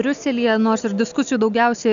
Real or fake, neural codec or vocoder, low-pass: real; none; 7.2 kHz